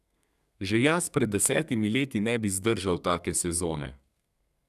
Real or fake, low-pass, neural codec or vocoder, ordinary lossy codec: fake; 14.4 kHz; codec, 32 kHz, 1.9 kbps, SNAC; none